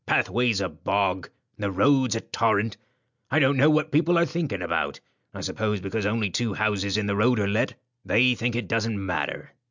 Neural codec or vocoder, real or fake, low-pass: none; real; 7.2 kHz